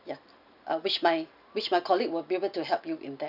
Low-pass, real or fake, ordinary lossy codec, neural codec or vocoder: 5.4 kHz; real; none; none